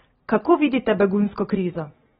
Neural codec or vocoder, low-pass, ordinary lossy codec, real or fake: none; 19.8 kHz; AAC, 16 kbps; real